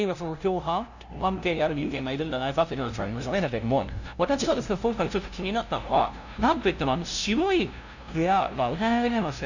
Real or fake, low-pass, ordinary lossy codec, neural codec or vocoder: fake; 7.2 kHz; none; codec, 16 kHz, 0.5 kbps, FunCodec, trained on LibriTTS, 25 frames a second